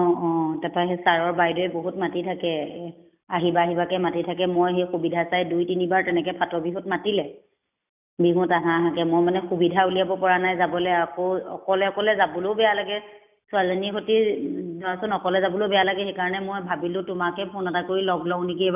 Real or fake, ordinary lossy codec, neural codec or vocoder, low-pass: real; none; none; 3.6 kHz